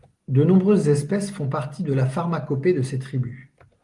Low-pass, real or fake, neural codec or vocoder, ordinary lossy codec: 10.8 kHz; real; none; Opus, 24 kbps